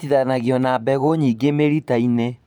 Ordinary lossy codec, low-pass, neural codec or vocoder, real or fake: none; 19.8 kHz; vocoder, 44.1 kHz, 128 mel bands every 512 samples, BigVGAN v2; fake